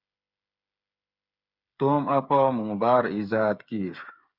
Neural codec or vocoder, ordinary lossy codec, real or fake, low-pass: codec, 16 kHz, 8 kbps, FreqCodec, smaller model; Opus, 64 kbps; fake; 5.4 kHz